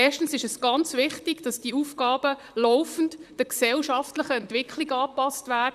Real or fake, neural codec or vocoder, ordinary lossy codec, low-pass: fake; vocoder, 44.1 kHz, 128 mel bands, Pupu-Vocoder; none; 14.4 kHz